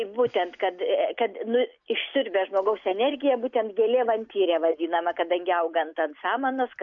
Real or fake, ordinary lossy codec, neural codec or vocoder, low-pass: real; AAC, 64 kbps; none; 7.2 kHz